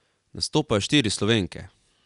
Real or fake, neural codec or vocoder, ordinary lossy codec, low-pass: real; none; none; 10.8 kHz